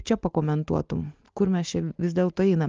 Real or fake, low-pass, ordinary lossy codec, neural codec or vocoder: real; 7.2 kHz; Opus, 24 kbps; none